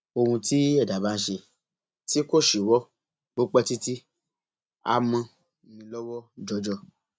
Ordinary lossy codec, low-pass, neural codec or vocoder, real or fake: none; none; none; real